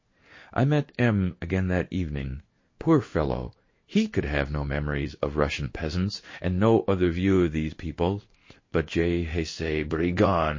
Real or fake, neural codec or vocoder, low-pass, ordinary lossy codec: fake; codec, 16 kHz in and 24 kHz out, 1 kbps, XY-Tokenizer; 7.2 kHz; MP3, 32 kbps